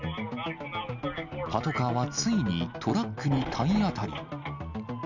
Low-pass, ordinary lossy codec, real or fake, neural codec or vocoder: 7.2 kHz; none; real; none